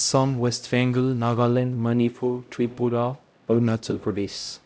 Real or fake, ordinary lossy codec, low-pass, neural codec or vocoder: fake; none; none; codec, 16 kHz, 0.5 kbps, X-Codec, HuBERT features, trained on LibriSpeech